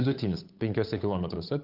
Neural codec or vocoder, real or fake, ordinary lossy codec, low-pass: codec, 16 kHz, 8 kbps, FunCodec, trained on Chinese and English, 25 frames a second; fake; Opus, 24 kbps; 5.4 kHz